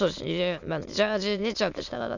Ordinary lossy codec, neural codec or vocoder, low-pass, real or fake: none; autoencoder, 22.05 kHz, a latent of 192 numbers a frame, VITS, trained on many speakers; 7.2 kHz; fake